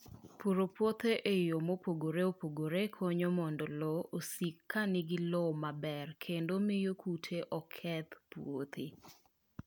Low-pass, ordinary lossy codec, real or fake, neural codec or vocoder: none; none; real; none